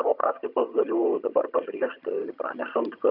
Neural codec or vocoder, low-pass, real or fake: vocoder, 22.05 kHz, 80 mel bands, HiFi-GAN; 5.4 kHz; fake